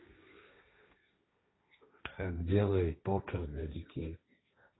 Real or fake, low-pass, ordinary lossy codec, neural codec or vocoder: fake; 7.2 kHz; AAC, 16 kbps; codec, 16 kHz, 1.1 kbps, Voila-Tokenizer